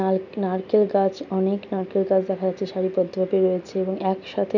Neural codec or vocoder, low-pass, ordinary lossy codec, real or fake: none; 7.2 kHz; none; real